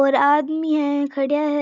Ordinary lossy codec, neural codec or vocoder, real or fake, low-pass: none; none; real; 7.2 kHz